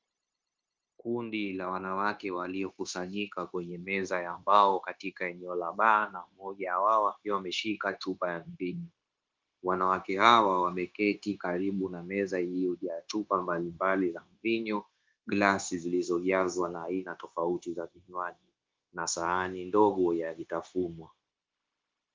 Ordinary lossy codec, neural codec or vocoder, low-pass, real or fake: Opus, 24 kbps; codec, 16 kHz, 0.9 kbps, LongCat-Audio-Codec; 7.2 kHz; fake